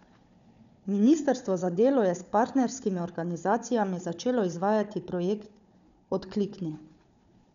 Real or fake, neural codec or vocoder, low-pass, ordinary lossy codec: fake; codec, 16 kHz, 4 kbps, FunCodec, trained on Chinese and English, 50 frames a second; 7.2 kHz; none